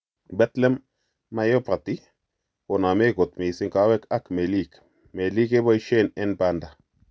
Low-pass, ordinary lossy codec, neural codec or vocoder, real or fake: none; none; none; real